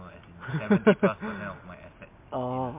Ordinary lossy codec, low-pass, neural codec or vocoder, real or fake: MP3, 24 kbps; 3.6 kHz; none; real